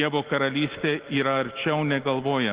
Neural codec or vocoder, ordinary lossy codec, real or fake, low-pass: none; Opus, 16 kbps; real; 3.6 kHz